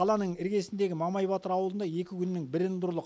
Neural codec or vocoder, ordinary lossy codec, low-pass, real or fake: none; none; none; real